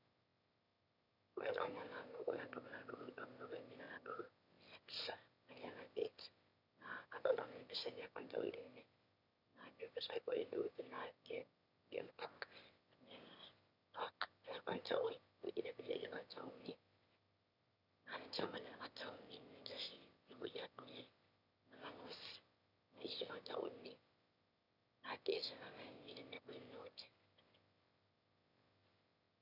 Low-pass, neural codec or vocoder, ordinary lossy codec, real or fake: 5.4 kHz; autoencoder, 22.05 kHz, a latent of 192 numbers a frame, VITS, trained on one speaker; none; fake